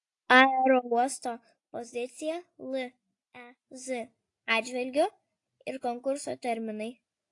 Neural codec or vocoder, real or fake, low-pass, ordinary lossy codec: none; real; 10.8 kHz; AAC, 48 kbps